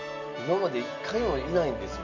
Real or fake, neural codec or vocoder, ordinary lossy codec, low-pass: real; none; MP3, 48 kbps; 7.2 kHz